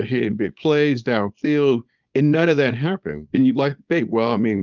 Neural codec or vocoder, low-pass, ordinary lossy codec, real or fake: codec, 24 kHz, 0.9 kbps, WavTokenizer, small release; 7.2 kHz; Opus, 32 kbps; fake